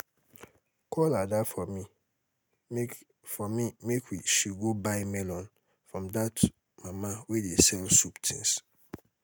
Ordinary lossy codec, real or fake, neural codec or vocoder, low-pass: none; real; none; none